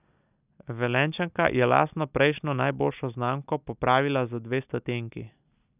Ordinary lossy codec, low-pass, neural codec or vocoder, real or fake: none; 3.6 kHz; none; real